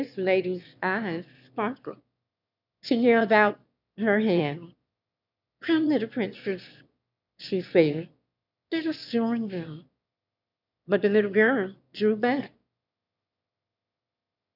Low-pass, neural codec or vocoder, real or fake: 5.4 kHz; autoencoder, 22.05 kHz, a latent of 192 numbers a frame, VITS, trained on one speaker; fake